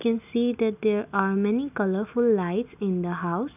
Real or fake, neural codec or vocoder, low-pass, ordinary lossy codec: real; none; 3.6 kHz; none